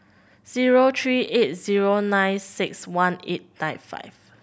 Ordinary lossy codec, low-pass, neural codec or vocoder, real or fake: none; none; none; real